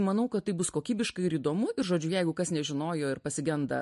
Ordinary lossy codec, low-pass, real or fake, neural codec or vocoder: MP3, 48 kbps; 14.4 kHz; real; none